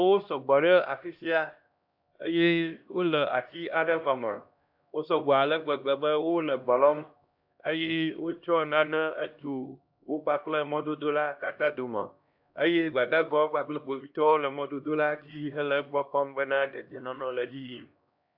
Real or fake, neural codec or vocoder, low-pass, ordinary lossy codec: fake; codec, 16 kHz, 1 kbps, X-Codec, HuBERT features, trained on LibriSpeech; 5.4 kHz; AAC, 48 kbps